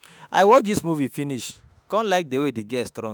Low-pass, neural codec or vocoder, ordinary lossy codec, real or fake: none; autoencoder, 48 kHz, 32 numbers a frame, DAC-VAE, trained on Japanese speech; none; fake